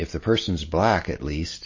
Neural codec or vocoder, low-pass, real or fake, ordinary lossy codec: none; 7.2 kHz; real; MP3, 32 kbps